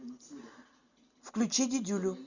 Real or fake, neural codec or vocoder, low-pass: real; none; 7.2 kHz